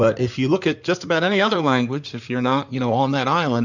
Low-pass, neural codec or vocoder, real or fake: 7.2 kHz; codec, 16 kHz in and 24 kHz out, 2.2 kbps, FireRedTTS-2 codec; fake